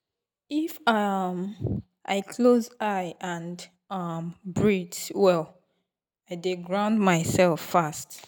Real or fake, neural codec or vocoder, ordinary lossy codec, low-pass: real; none; none; none